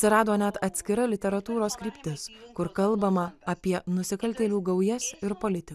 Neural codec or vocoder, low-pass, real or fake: none; 14.4 kHz; real